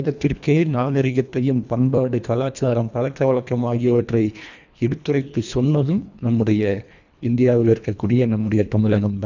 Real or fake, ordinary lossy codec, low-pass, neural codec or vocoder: fake; none; 7.2 kHz; codec, 24 kHz, 1.5 kbps, HILCodec